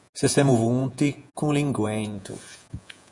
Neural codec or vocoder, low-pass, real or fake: vocoder, 48 kHz, 128 mel bands, Vocos; 10.8 kHz; fake